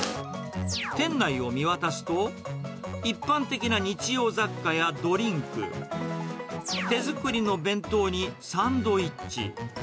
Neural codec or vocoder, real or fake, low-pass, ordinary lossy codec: none; real; none; none